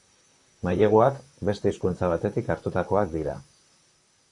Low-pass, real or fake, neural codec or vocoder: 10.8 kHz; fake; vocoder, 44.1 kHz, 128 mel bands, Pupu-Vocoder